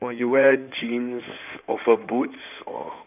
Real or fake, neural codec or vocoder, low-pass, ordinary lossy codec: fake; codec, 16 kHz in and 24 kHz out, 2.2 kbps, FireRedTTS-2 codec; 3.6 kHz; none